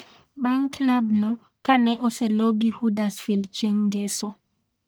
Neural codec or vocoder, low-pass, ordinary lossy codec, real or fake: codec, 44.1 kHz, 1.7 kbps, Pupu-Codec; none; none; fake